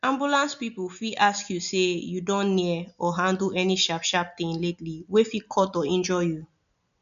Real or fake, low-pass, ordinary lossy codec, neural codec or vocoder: real; 7.2 kHz; none; none